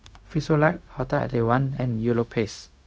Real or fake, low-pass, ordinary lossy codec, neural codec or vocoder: fake; none; none; codec, 16 kHz, 0.4 kbps, LongCat-Audio-Codec